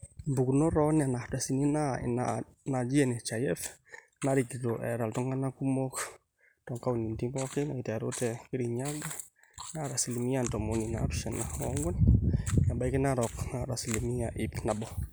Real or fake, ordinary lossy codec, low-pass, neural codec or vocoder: real; none; none; none